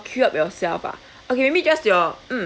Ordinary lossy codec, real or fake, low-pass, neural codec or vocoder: none; real; none; none